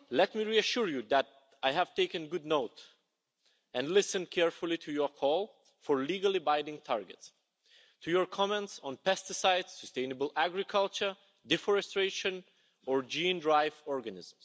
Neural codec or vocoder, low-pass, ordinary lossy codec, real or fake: none; none; none; real